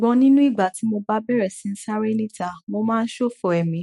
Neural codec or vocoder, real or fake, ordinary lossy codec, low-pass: autoencoder, 48 kHz, 128 numbers a frame, DAC-VAE, trained on Japanese speech; fake; MP3, 48 kbps; 19.8 kHz